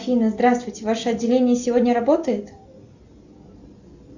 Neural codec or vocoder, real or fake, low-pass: none; real; 7.2 kHz